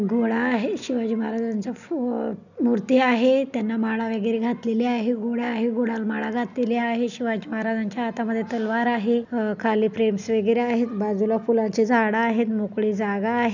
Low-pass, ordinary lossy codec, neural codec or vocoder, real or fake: 7.2 kHz; none; none; real